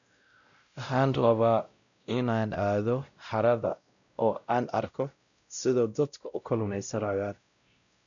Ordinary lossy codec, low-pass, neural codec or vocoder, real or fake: none; 7.2 kHz; codec, 16 kHz, 0.5 kbps, X-Codec, WavLM features, trained on Multilingual LibriSpeech; fake